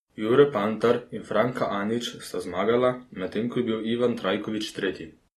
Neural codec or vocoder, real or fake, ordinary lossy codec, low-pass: none; real; AAC, 32 kbps; 19.8 kHz